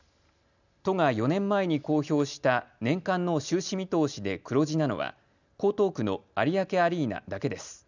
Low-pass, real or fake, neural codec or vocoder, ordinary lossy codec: 7.2 kHz; real; none; none